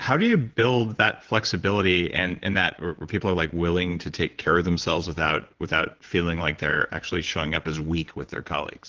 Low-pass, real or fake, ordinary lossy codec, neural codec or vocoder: 7.2 kHz; fake; Opus, 16 kbps; vocoder, 22.05 kHz, 80 mel bands, WaveNeXt